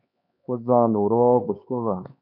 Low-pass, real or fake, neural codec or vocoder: 5.4 kHz; fake; codec, 16 kHz, 4 kbps, X-Codec, HuBERT features, trained on LibriSpeech